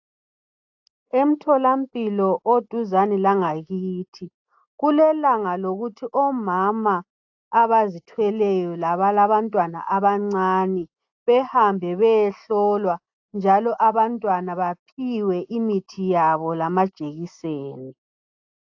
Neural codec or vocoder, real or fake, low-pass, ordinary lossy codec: none; real; 7.2 kHz; AAC, 48 kbps